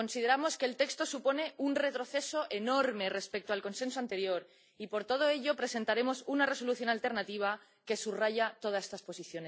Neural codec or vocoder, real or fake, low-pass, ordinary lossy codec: none; real; none; none